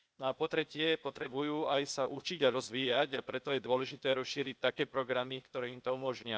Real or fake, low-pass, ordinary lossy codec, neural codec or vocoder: fake; none; none; codec, 16 kHz, 0.8 kbps, ZipCodec